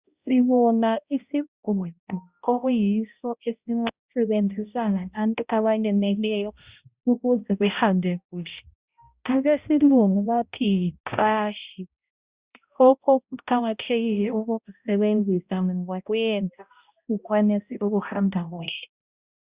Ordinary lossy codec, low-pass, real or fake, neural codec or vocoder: Opus, 64 kbps; 3.6 kHz; fake; codec, 16 kHz, 0.5 kbps, X-Codec, HuBERT features, trained on balanced general audio